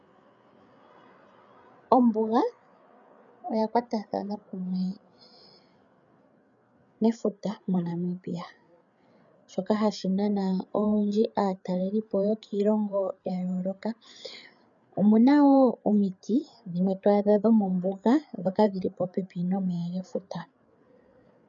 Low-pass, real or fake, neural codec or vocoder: 7.2 kHz; fake; codec, 16 kHz, 8 kbps, FreqCodec, larger model